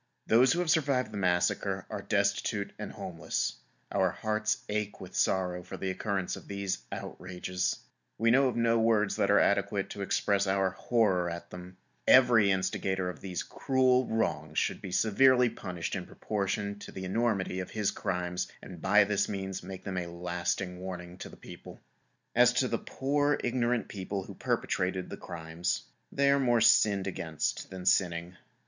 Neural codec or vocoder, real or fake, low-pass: none; real; 7.2 kHz